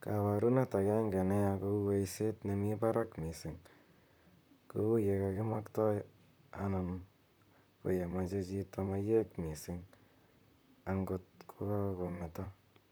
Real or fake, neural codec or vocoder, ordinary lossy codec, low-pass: fake; vocoder, 44.1 kHz, 128 mel bands, Pupu-Vocoder; none; none